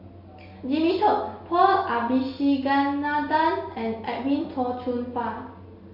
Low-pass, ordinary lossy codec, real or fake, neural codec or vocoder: 5.4 kHz; MP3, 32 kbps; real; none